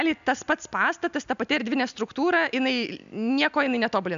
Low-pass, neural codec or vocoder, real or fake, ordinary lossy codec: 7.2 kHz; none; real; AAC, 96 kbps